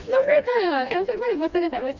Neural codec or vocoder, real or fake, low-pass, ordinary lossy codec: codec, 16 kHz, 1 kbps, FreqCodec, smaller model; fake; 7.2 kHz; none